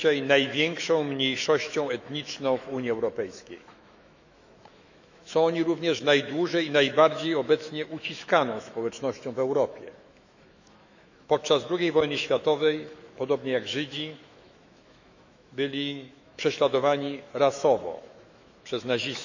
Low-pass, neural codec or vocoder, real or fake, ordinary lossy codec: 7.2 kHz; autoencoder, 48 kHz, 128 numbers a frame, DAC-VAE, trained on Japanese speech; fake; none